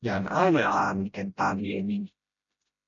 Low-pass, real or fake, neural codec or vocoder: 7.2 kHz; fake; codec, 16 kHz, 0.5 kbps, FreqCodec, smaller model